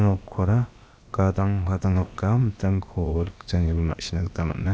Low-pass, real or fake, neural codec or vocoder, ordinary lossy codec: none; fake; codec, 16 kHz, about 1 kbps, DyCAST, with the encoder's durations; none